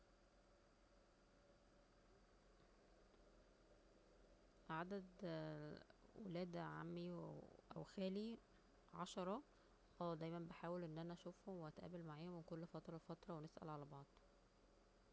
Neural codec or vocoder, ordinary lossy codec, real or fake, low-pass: none; none; real; none